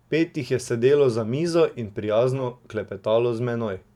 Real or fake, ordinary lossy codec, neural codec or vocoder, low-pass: fake; none; vocoder, 44.1 kHz, 128 mel bands every 512 samples, BigVGAN v2; 19.8 kHz